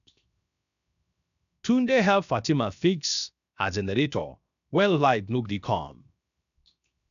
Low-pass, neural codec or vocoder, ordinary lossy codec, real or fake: 7.2 kHz; codec, 16 kHz, 0.7 kbps, FocalCodec; none; fake